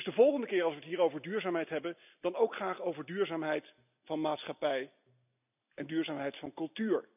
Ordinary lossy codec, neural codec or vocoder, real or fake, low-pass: none; none; real; 3.6 kHz